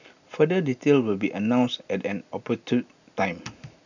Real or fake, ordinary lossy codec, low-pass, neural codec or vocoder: real; none; 7.2 kHz; none